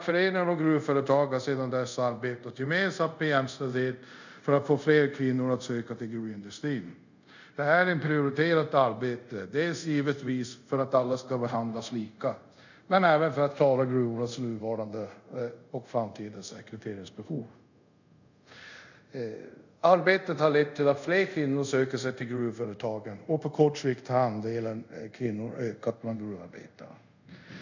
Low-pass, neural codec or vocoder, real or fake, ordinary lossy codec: 7.2 kHz; codec, 24 kHz, 0.5 kbps, DualCodec; fake; none